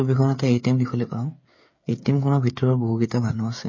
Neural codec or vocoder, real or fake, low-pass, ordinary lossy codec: codec, 16 kHz, 8 kbps, FreqCodec, smaller model; fake; 7.2 kHz; MP3, 32 kbps